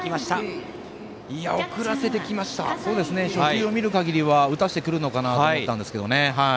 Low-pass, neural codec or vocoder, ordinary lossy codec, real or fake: none; none; none; real